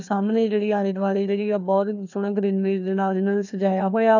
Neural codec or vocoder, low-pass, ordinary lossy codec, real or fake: codec, 16 kHz, 2 kbps, FreqCodec, larger model; 7.2 kHz; none; fake